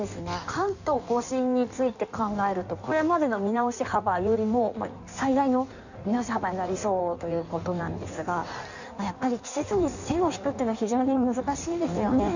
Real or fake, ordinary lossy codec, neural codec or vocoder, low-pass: fake; none; codec, 16 kHz in and 24 kHz out, 1.1 kbps, FireRedTTS-2 codec; 7.2 kHz